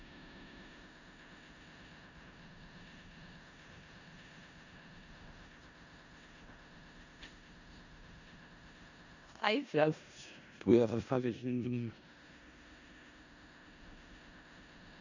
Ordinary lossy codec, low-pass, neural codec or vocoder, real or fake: none; 7.2 kHz; codec, 16 kHz in and 24 kHz out, 0.4 kbps, LongCat-Audio-Codec, four codebook decoder; fake